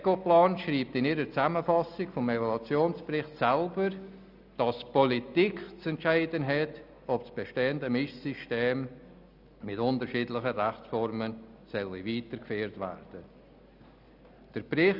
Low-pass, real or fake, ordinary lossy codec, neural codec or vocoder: 5.4 kHz; real; none; none